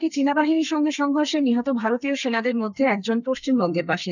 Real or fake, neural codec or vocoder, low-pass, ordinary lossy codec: fake; codec, 32 kHz, 1.9 kbps, SNAC; 7.2 kHz; none